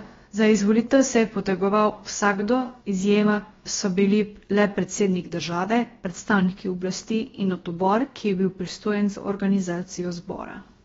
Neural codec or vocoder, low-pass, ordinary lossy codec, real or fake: codec, 16 kHz, about 1 kbps, DyCAST, with the encoder's durations; 7.2 kHz; AAC, 24 kbps; fake